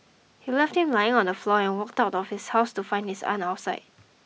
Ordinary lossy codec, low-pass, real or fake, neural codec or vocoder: none; none; real; none